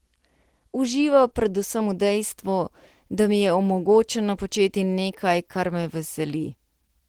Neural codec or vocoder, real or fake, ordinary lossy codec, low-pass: none; real; Opus, 16 kbps; 19.8 kHz